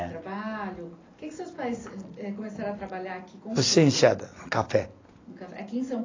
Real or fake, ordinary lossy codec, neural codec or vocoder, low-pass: real; AAC, 32 kbps; none; 7.2 kHz